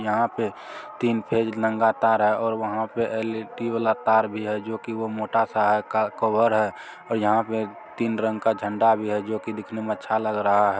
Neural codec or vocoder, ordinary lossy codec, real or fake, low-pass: none; none; real; none